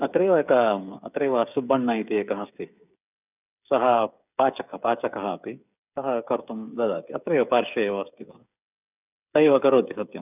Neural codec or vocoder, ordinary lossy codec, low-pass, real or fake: codec, 16 kHz, 8 kbps, FreqCodec, smaller model; none; 3.6 kHz; fake